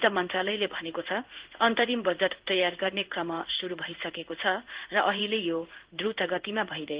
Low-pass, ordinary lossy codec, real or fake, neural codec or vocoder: 3.6 kHz; Opus, 16 kbps; fake; codec, 16 kHz in and 24 kHz out, 1 kbps, XY-Tokenizer